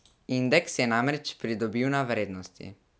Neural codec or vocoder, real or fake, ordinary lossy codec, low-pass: none; real; none; none